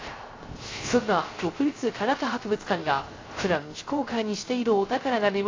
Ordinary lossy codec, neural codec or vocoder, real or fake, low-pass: AAC, 32 kbps; codec, 16 kHz, 0.3 kbps, FocalCodec; fake; 7.2 kHz